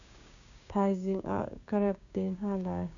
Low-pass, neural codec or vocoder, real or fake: 7.2 kHz; codec, 16 kHz, 6 kbps, DAC; fake